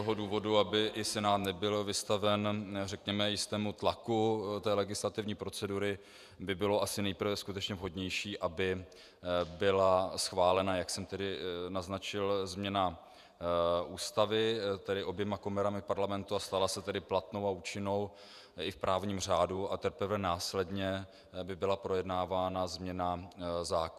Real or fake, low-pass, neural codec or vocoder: fake; 14.4 kHz; vocoder, 48 kHz, 128 mel bands, Vocos